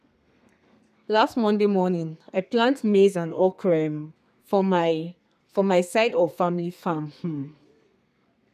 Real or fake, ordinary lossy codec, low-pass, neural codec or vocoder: fake; none; 14.4 kHz; codec, 32 kHz, 1.9 kbps, SNAC